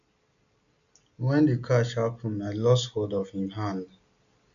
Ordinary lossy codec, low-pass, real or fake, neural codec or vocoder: AAC, 96 kbps; 7.2 kHz; real; none